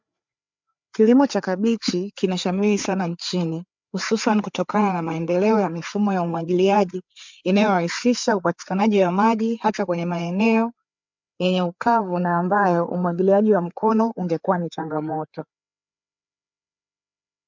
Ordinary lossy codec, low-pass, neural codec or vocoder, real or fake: MP3, 64 kbps; 7.2 kHz; codec, 16 kHz, 4 kbps, FreqCodec, larger model; fake